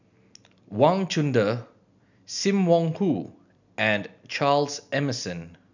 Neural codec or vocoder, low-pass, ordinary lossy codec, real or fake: none; 7.2 kHz; none; real